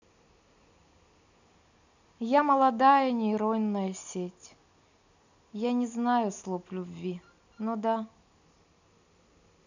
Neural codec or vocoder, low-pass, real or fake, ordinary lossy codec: none; 7.2 kHz; real; none